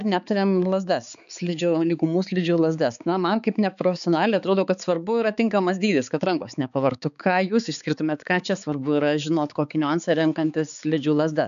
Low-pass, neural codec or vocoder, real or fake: 7.2 kHz; codec, 16 kHz, 4 kbps, X-Codec, HuBERT features, trained on balanced general audio; fake